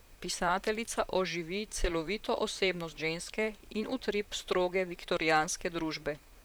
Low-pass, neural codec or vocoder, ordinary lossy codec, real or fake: none; vocoder, 44.1 kHz, 128 mel bands, Pupu-Vocoder; none; fake